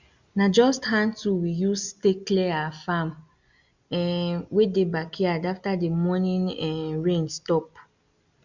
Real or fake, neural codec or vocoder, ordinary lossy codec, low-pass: real; none; Opus, 64 kbps; 7.2 kHz